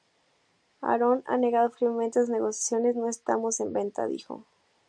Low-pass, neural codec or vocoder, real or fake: 9.9 kHz; none; real